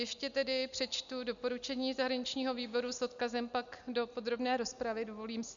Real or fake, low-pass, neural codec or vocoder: real; 7.2 kHz; none